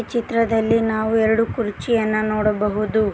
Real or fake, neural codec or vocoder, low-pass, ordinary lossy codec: real; none; none; none